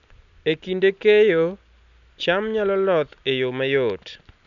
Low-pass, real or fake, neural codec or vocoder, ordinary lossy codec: 7.2 kHz; real; none; none